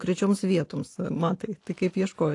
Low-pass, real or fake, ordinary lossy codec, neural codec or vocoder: 10.8 kHz; real; AAC, 48 kbps; none